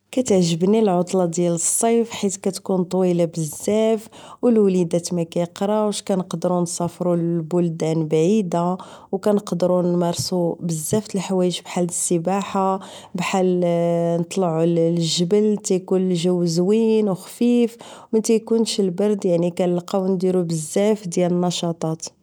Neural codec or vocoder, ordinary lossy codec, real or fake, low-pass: none; none; real; none